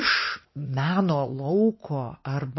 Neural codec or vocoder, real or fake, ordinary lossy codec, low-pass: none; real; MP3, 24 kbps; 7.2 kHz